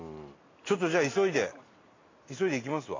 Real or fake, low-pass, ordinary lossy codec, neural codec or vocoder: real; 7.2 kHz; AAC, 32 kbps; none